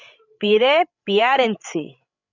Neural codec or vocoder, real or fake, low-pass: codec, 16 kHz, 16 kbps, FreqCodec, larger model; fake; 7.2 kHz